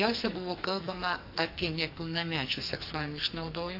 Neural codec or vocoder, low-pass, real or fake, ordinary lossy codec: codec, 44.1 kHz, 2.6 kbps, SNAC; 5.4 kHz; fake; AAC, 48 kbps